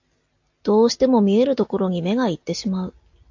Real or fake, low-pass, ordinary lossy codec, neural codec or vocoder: real; 7.2 kHz; AAC, 48 kbps; none